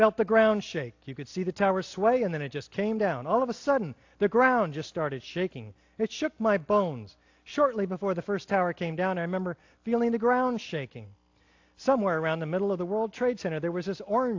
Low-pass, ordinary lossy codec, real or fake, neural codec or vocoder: 7.2 kHz; AAC, 48 kbps; real; none